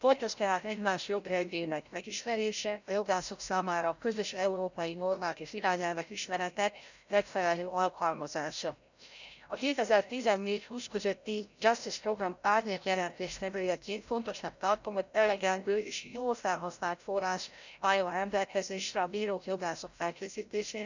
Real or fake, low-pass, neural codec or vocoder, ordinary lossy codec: fake; 7.2 kHz; codec, 16 kHz, 0.5 kbps, FreqCodec, larger model; none